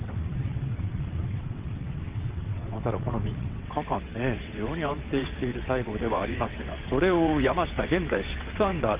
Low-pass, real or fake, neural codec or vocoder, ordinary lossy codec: 3.6 kHz; fake; vocoder, 22.05 kHz, 80 mel bands, WaveNeXt; Opus, 24 kbps